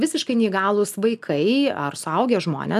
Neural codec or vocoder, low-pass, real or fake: none; 14.4 kHz; real